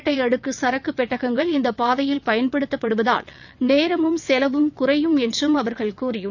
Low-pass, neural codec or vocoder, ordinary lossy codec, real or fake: 7.2 kHz; vocoder, 22.05 kHz, 80 mel bands, WaveNeXt; none; fake